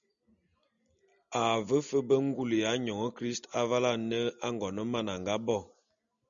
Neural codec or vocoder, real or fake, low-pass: none; real; 7.2 kHz